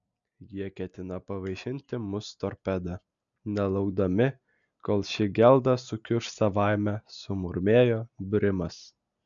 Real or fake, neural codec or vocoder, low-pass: real; none; 7.2 kHz